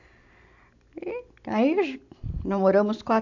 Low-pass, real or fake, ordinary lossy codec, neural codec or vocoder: 7.2 kHz; real; AAC, 48 kbps; none